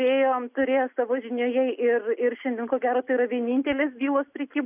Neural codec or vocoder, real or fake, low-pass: none; real; 3.6 kHz